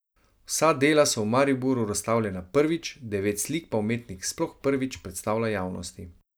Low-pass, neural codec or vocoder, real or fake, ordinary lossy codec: none; none; real; none